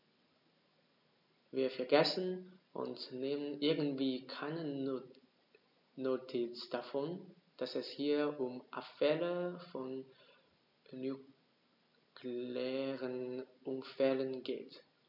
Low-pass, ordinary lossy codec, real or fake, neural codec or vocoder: 5.4 kHz; none; real; none